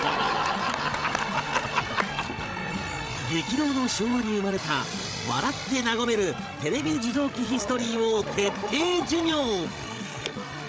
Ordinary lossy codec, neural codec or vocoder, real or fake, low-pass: none; codec, 16 kHz, 16 kbps, FreqCodec, larger model; fake; none